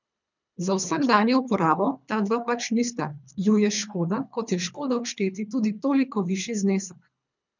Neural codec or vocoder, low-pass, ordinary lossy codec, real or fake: codec, 24 kHz, 3 kbps, HILCodec; 7.2 kHz; none; fake